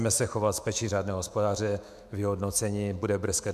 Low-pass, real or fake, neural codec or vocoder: 14.4 kHz; fake; autoencoder, 48 kHz, 128 numbers a frame, DAC-VAE, trained on Japanese speech